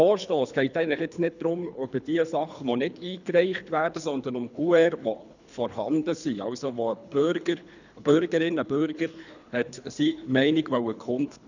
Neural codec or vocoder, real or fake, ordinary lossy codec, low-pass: codec, 24 kHz, 3 kbps, HILCodec; fake; none; 7.2 kHz